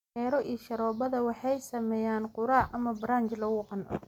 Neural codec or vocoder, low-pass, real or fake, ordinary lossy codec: none; 19.8 kHz; real; MP3, 96 kbps